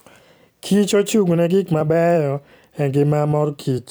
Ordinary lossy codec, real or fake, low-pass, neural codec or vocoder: none; fake; none; vocoder, 44.1 kHz, 128 mel bands every 256 samples, BigVGAN v2